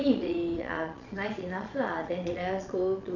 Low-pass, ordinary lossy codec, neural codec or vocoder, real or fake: 7.2 kHz; none; vocoder, 22.05 kHz, 80 mel bands, Vocos; fake